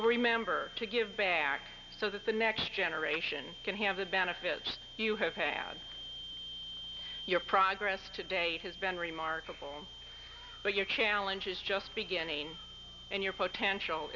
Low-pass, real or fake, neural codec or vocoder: 7.2 kHz; real; none